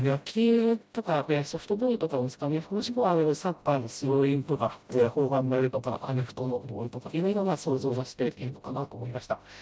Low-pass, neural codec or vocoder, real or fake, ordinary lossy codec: none; codec, 16 kHz, 0.5 kbps, FreqCodec, smaller model; fake; none